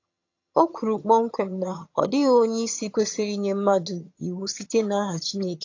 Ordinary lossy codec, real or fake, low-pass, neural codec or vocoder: AAC, 48 kbps; fake; 7.2 kHz; vocoder, 22.05 kHz, 80 mel bands, HiFi-GAN